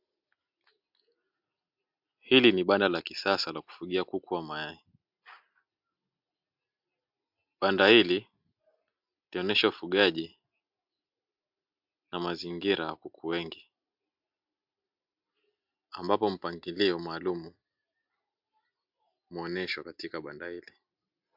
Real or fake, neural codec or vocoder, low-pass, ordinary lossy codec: real; none; 5.4 kHz; MP3, 48 kbps